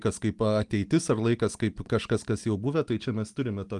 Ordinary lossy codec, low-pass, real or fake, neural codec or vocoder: Opus, 32 kbps; 10.8 kHz; real; none